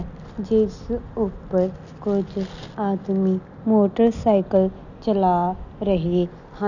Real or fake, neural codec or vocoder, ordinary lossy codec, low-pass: real; none; none; 7.2 kHz